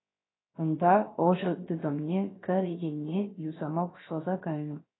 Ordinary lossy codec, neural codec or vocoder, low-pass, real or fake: AAC, 16 kbps; codec, 16 kHz, 0.7 kbps, FocalCodec; 7.2 kHz; fake